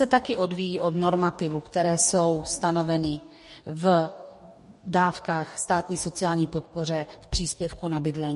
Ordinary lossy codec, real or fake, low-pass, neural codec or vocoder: MP3, 48 kbps; fake; 14.4 kHz; codec, 44.1 kHz, 2.6 kbps, DAC